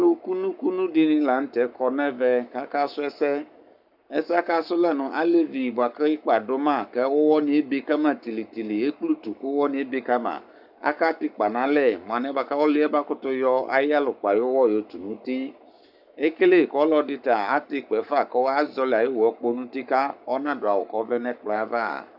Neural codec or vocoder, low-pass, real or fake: codec, 44.1 kHz, 7.8 kbps, Pupu-Codec; 5.4 kHz; fake